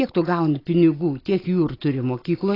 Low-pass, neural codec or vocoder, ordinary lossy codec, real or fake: 5.4 kHz; none; AAC, 24 kbps; real